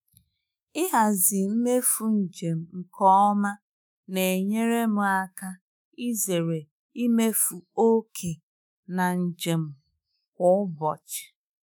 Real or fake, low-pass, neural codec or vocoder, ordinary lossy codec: fake; none; autoencoder, 48 kHz, 128 numbers a frame, DAC-VAE, trained on Japanese speech; none